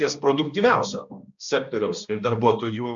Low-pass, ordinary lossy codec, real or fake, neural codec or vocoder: 7.2 kHz; MP3, 64 kbps; fake; codec, 16 kHz, 1 kbps, X-Codec, HuBERT features, trained on balanced general audio